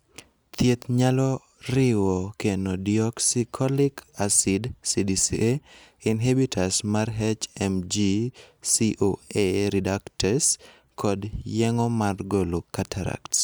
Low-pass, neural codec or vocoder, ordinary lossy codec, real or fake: none; none; none; real